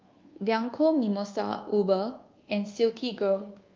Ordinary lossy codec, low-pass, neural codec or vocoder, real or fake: Opus, 32 kbps; 7.2 kHz; codec, 24 kHz, 1.2 kbps, DualCodec; fake